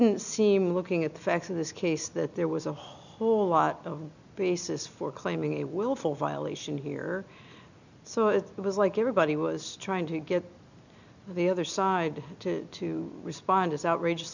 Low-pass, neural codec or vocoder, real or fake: 7.2 kHz; none; real